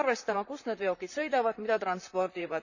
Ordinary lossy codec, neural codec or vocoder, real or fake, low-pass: none; vocoder, 44.1 kHz, 128 mel bands, Pupu-Vocoder; fake; 7.2 kHz